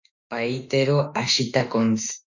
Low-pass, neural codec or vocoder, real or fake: 7.2 kHz; autoencoder, 48 kHz, 32 numbers a frame, DAC-VAE, trained on Japanese speech; fake